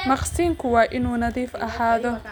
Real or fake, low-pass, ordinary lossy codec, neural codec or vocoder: real; none; none; none